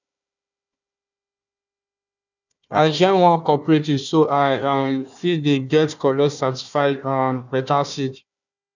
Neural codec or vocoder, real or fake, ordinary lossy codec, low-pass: codec, 16 kHz, 1 kbps, FunCodec, trained on Chinese and English, 50 frames a second; fake; none; 7.2 kHz